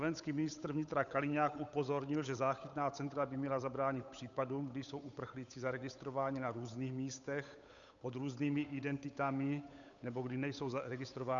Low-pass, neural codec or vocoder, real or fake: 7.2 kHz; codec, 16 kHz, 8 kbps, FunCodec, trained on Chinese and English, 25 frames a second; fake